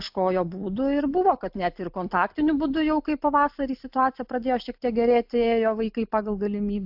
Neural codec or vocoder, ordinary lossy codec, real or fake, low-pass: none; AAC, 48 kbps; real; 5.4 kHz